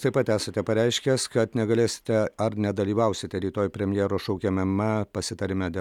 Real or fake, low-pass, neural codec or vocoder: real; 19.8 kHz; none